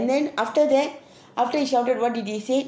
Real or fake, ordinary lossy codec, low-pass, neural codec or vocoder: real; none; none; none